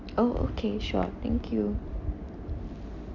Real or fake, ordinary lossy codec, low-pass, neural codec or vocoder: real; none; 7.2 kHz; none